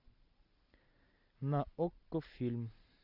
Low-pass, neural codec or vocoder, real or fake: 5.4 kHz; none; real